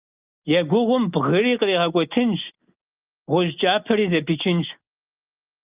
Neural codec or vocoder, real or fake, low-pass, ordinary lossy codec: none; real; 3.6 kHz; Opus, 24 kbps